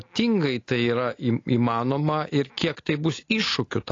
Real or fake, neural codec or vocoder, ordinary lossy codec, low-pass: real; none; AAC, 32 kbps; 7.2 kHz